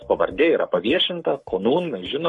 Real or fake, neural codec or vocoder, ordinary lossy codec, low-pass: fake; codec, 44.1 kHz, 7.8 kbps, Pupu-Codec; MP3, 48 kbps; 10.8 kHz